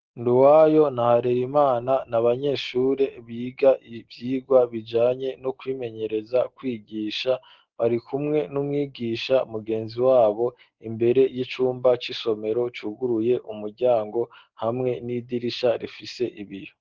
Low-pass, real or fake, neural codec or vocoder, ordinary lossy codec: 7.2 kHz; real; none; Opus, 16 kbps